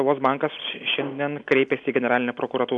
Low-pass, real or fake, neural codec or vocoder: 10.8 kHz; real; none